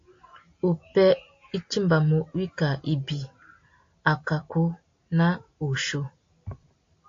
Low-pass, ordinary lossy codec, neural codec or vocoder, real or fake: 7.2 kHz; AAC, 48 kbps; none; real